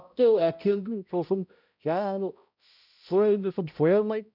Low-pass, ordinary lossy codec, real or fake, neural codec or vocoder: 5.4 kHz; none; fake; codec, 16 kHz, 0.5 kbps, X-Codec, HuBERT features, trained on balanced general audio